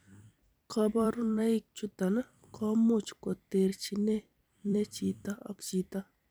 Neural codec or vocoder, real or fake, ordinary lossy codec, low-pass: vocoder, 44.1 kHz, 128 mel bands every 256 samples, BigVGAN v2; fake; none; none